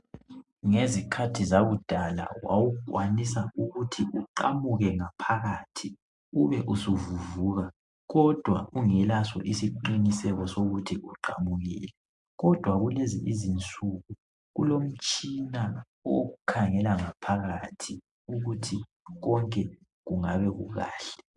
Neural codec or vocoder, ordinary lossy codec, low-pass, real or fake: none; MP3, 64 kbps; 10.8 kHz; real